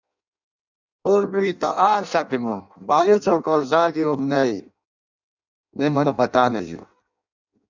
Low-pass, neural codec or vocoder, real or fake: 7.2 kHz; codec, 16 kHz in and 24 kHz out, 0.6 kbps, FireRedTTS-2 codec; fake